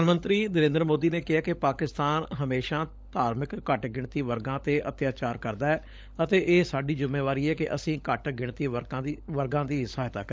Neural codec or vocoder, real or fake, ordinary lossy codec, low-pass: codec, 16 kHz, 16 kbps, FunCodec, trained on LibriTTS, 50 frames a second; fake; none; none